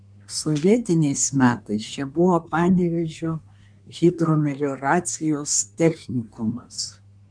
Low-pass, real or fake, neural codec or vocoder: 9.9 kHz; fake; codec, 24 kHz, 1 kbps, SNAC